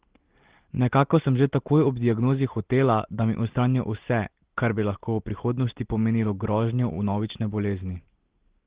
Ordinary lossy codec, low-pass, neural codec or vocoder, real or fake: Opus, 16 kbps; 3.6 kHz; none; real